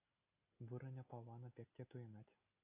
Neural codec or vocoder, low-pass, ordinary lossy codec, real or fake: none; 3.6 kHz; AAC, 24 kbps; real